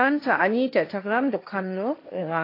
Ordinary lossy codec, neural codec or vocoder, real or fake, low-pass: AAC, 32 kbps; codec, 16 kHz, 1.1 kbps, Voila-Tokenizer; fake; 5.4 kHz